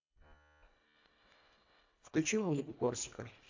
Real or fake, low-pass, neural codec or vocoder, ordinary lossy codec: fake; 7.2 kHz; codec, 24 kHz, 1.5 kbps, HILCodec; AAC, 48 kbps